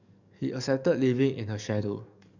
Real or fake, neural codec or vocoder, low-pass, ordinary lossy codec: fake; codec, 44.1 kHz, 7.8 kbps, DAC; 7.2 kHz; none